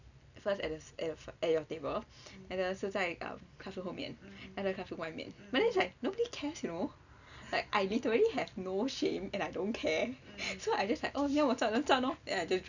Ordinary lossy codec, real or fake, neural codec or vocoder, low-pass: none; real; none; 7.2 kHz